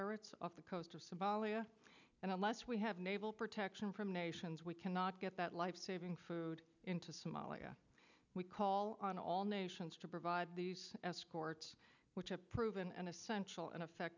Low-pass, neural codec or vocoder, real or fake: 7.2 kHz; none; real